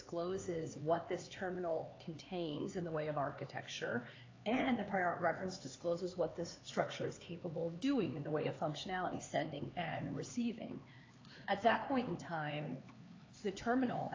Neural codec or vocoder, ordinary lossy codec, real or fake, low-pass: codec, 16 kHz, 4 kbps, X-Codec, HuBERT features, trained on LibriSpeech; AAC, 32 kbps; fake; 7.2 kHz